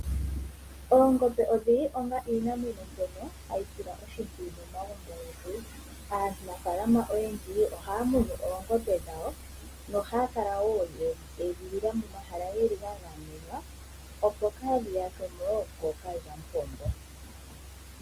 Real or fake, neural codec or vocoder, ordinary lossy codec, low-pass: real; none; Opus, 32 kbps; 14.4 kHz